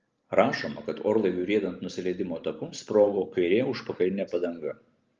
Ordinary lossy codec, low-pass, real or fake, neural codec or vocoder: Opus, 24 kbps; 7.2 kHz; real; none